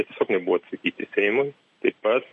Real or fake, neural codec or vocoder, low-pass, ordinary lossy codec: real; none; 10.8 kHz; MP3, 48 kbps